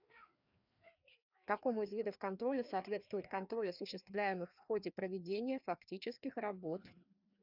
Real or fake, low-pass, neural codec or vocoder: fake; 5.4 kHz; codec, 16 kHz, 2 kbps, FreqCodec, larger model